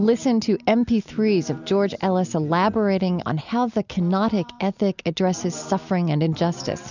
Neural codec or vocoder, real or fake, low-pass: none; real; 7.2 kHz